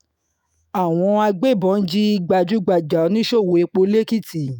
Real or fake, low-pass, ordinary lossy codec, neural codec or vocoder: fake; none; none; autoencoder, 48 kHz, 128 numbers a frame, DAC-VAE, trained on Japanese speech